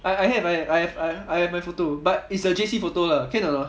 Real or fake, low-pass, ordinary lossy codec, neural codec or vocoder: real; none; none; none